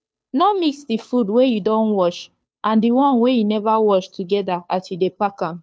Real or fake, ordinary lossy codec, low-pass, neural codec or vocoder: fake; none; none; codec, 16 kHz, 2 kbps, FunCodec, trained on Chinese and English, 25 frames a second